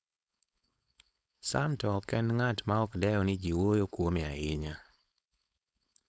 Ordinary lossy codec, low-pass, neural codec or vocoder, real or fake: none; none; codec, 16 kHz, 4.8 kbps, FACodec; fake